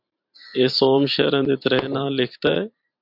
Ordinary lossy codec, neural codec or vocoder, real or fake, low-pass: MP3, 48 kbps; vocoder, 44.1 kHz, 128 mel bands every 512 samples, BigVGAN v2; fake; 5.4 kHz